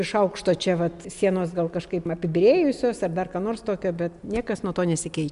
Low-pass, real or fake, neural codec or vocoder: 10.8 kHz; real; none